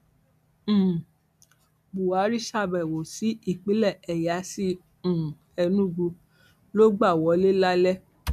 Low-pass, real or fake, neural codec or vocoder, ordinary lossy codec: 14.4 kHz; real; none; none